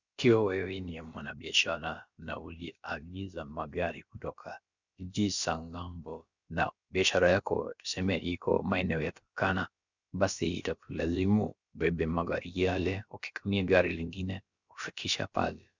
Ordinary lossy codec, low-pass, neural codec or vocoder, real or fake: Opus, 64 kbps; 7.2 kHz; codec, 16 kHz, about 1 kbps, DyCAST, with the encoder's durations; fake